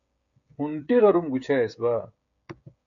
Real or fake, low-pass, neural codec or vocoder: fake; 7.2 kHz; codec, 16 kHz, 16 kbps, FreqCodec, smaller model